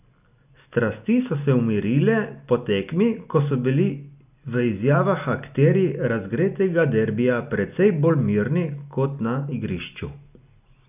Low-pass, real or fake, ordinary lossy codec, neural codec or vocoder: 3.6 kHz; real; none; none